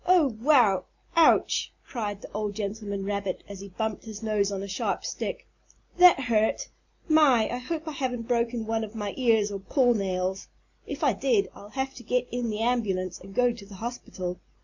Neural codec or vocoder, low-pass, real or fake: none; 7.2 kHz; real